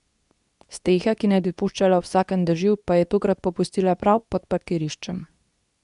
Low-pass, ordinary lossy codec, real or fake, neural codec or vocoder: 10.8 kHz; none; fake; codec, 24 kHz, 0.9 kbps, WavTokenizer, medium speech release version 2